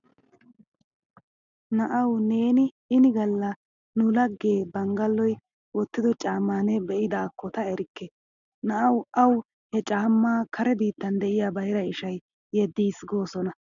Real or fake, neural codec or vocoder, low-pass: real; none; 7.2 kHz